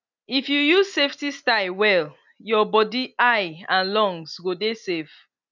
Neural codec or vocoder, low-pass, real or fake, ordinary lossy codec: none; 7.2 kHz; real; none